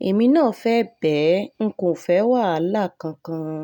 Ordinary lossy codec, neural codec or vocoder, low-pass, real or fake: none; none; 19.8 kHz; real